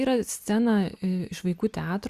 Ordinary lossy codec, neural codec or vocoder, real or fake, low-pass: Opus, 64 kbps; none; real; 14.4 kHz